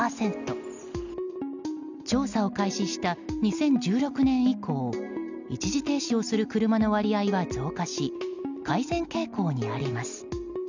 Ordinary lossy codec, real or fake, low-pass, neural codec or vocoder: none; real; 7.2 kHz; none